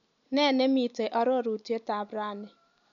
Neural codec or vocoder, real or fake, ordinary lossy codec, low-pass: none; real; none; 7.2 kHz